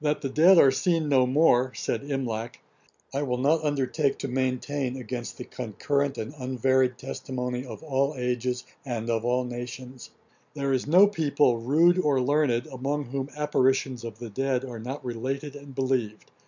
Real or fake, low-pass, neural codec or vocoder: real; 7.2 kHz; none